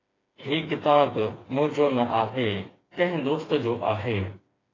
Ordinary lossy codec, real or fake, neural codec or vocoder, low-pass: AAC, 32 kbps; fake; codec, 16 kHz, 4 kbps, FreqCodec, smaller model; 7.2 kHz